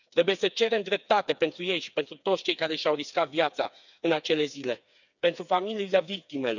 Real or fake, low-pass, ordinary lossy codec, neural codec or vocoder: fake; 7.2 kHz; none; codec, 16 kHz, 4 kbps, FreqCodec, smaller model